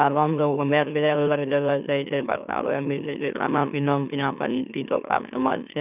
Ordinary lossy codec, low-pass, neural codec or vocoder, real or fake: none; 3.6 kHz; autoencoder, 44.1 kHz, a latent of 192 numbers a frame, MeloTTS; fake